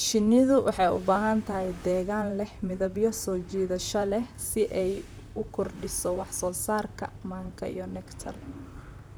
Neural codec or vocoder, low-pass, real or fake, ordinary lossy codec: vocoder, 44.1 kHz, 128 mel bands, Pupu-Vocoder; none; fake; none